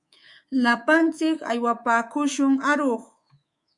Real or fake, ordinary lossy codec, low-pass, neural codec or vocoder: fake; Opus, 64 kbps; 10.8 kHz; codec, 24 kHz, 3.1 kbps, DualCodec